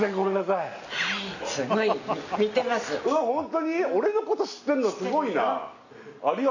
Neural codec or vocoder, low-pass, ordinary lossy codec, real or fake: none; 7.2 kHz; none; real